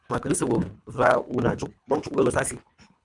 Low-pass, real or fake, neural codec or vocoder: 10.8 kHz; fake; codec, 24 kHz, 3 kbps, HILCodec